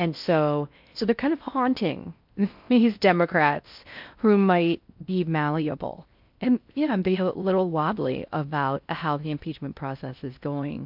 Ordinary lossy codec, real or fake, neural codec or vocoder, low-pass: MP3, 48 kbps; fake; codec, 16 kHz in and 24 kHz out, 0.6 kbps, FocalCodec, streaming, 4096 codes; 5.4 kHz